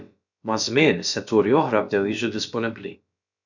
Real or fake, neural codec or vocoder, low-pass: fake; codec, 16 kHz, about 1 kbps, DyCAST, with the encoder's durations; 7.2 kHz